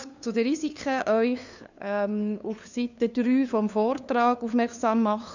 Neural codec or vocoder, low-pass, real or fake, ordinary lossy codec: codec, 16 kHz, 2 kbps, FunCodec, trained on LibriTTS, 25 frames a second; 7.2 kHz; fake; none